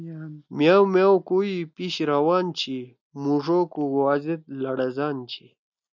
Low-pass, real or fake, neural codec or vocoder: 7.2 kHz; real; none